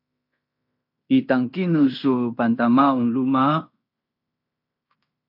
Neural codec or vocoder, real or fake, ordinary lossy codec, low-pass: codec, 16 kHz in and 24 kHz out, 0.9 kbps, LongCat-Audio-Codec, fine tuned four codebook decoder; fake; AAC, 48 kbps; 5.4 kHz